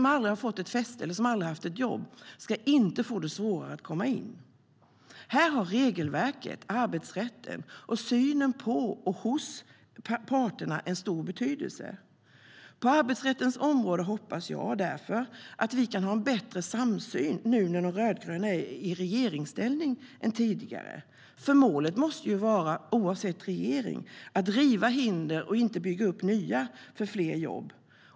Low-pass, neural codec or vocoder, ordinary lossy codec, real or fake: none; none; none; real